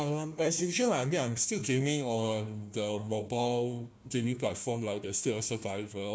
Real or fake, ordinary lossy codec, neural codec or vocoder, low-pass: fake; none; codec, 16 kHz, 1 kbps, FunCodec, trained on Chinese and English, 50 frames a second; none